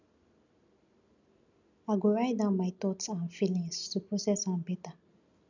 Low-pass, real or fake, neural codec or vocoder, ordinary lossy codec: 7.2 kHz; real; none; none